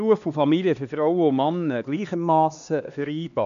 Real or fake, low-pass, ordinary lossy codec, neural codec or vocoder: fake; 7.2 kHz; AAC, 96 kbps; codec, 16 kHz, 2 kbps, X-Codec, HuBERT features, trained on LibriSpeech